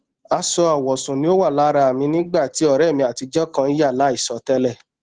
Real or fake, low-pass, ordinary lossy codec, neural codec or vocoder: real; 9.9 kHz; Opus, 16 kbps; none